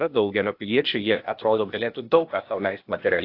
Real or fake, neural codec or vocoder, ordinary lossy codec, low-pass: fake; codec, 16 kHz, 0.8 kbps, ZipCodec; AAC, 32 kbps; 5.4 kHz